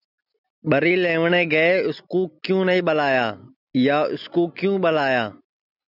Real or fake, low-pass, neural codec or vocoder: real; 5.4 kHz; none